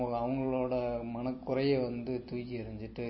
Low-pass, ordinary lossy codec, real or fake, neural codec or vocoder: 7.2 kHz; MP3, 24 kbps; real; none